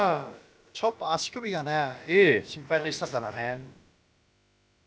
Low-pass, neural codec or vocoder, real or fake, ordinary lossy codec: none; codec, 16 kHz, about 1 kbps, DyCAST, with the encoder's durations; fake; none